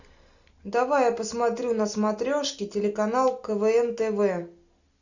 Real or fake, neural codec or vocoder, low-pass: real; none; 7.2 kHz